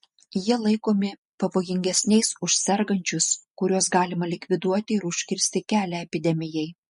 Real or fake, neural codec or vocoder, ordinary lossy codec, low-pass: real; none; MP3, 48 kbps; 10.8 kHz